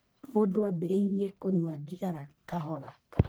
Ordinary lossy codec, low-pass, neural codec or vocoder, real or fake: none; none; codec, 44.1 kHz, 1.7 kbps, Pupu-Codec; fake